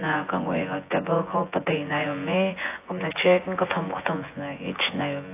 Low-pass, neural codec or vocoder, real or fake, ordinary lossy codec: 3.6 kHz; vocoder, 24 kHz, 100 mel bands, Vocos; fake; AAC, 24 kbps